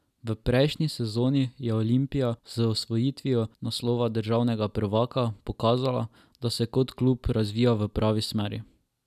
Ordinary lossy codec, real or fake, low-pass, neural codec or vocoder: none; real; 14.4 kHz; none